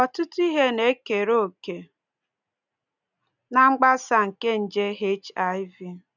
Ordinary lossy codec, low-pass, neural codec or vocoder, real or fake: none; 7.2 kHz; none; real